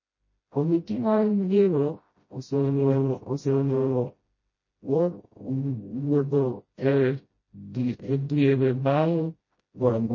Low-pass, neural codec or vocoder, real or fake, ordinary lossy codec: 7.2 kHz; codec, 16 kHz, 0.5 kbps, FreqCodec, smaller model; fake; MP3, 32 kbps